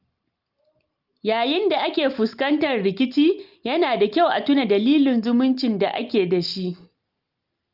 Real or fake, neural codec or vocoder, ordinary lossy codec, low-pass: real; none; Opus, 32 kbps; 5.4 kHz